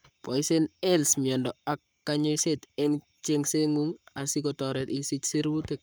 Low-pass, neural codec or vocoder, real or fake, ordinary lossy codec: none; codec, 44.1 kHz, 7.8 kbps, Pupu-Codec; fake; none